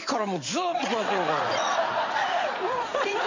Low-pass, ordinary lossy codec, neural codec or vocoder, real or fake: 7.2 kHz; AAC, 48 kbps; vocoder, 44.1 kHz, 80 mel bands, Vocos; fake